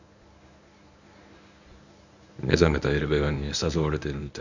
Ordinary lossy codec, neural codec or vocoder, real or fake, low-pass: none; codec, 24 kHz, 0.9 kbps, WavTokenizer, medium speech release version 1; fake; 7.2 kHz